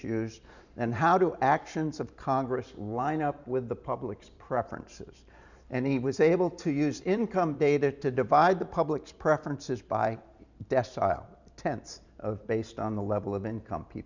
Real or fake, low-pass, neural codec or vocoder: fake; 7.2 kHz; vocoder, 44.1 kHz, 128 mel bands every 512 samples, BigVGAN v2